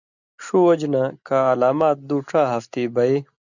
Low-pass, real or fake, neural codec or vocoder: 7.2 kHz; real; none